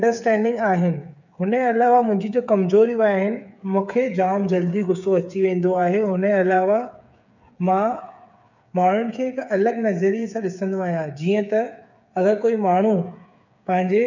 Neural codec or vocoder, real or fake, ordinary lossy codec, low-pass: codec, 16 kHz, 8 kbps, FreqCodec, smaller model; fake; none; 7.2 kHz